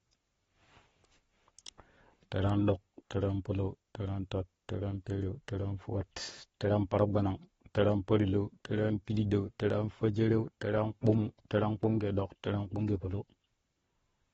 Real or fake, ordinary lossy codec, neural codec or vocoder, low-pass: fake; AAC, 24 kbps; codec, 44.1 kHz, 7.8 kbps, Pupu-Codec; 19.8 kHz